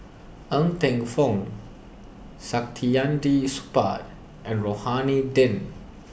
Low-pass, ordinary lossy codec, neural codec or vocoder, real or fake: none; none; none; real